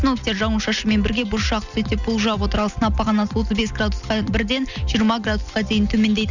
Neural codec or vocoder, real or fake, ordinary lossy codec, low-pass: none; real; none; 7.2 kHz